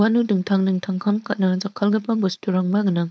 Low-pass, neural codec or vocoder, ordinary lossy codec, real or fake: none; codec, 16 kHz, 4.8 kbps, FACodec; none; fake